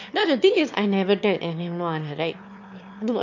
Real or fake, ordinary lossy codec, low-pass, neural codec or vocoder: fake; MP3, 48 kbps; 7.2 kHz; autoencoder, 22.05 kHz, a latent of 192 numbers a frame, VITS, trained on one speaker